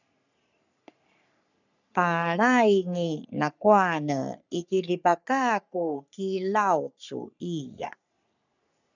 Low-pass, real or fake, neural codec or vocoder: 7.2 kHz; fake; codec, 44.1 kHz, 3.4 kbps, Pupu-Codec